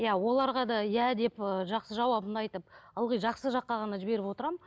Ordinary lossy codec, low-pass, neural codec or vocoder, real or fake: none; none; none; real